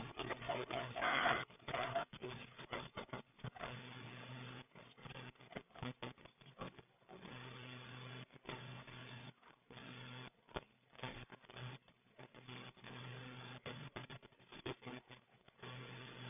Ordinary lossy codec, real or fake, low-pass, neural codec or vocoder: none; fake; 3.6 kHz; codec, 16 kHz, 8 kbps, FreqCodec, larger model